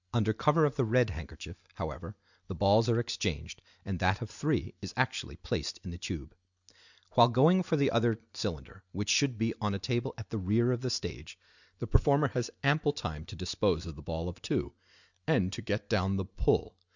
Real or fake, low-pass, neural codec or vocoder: real; 7.2 kHz; none